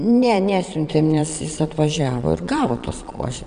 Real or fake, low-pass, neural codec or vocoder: fake; 9.9 kHz; vocoder, 22.05 kHz, 80 mel bands, Vocos